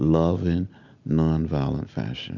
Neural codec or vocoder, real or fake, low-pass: none; real; 7.2 kHz